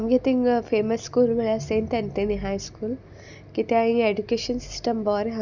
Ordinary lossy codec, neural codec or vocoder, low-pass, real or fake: none; none; 7.2 kHz; real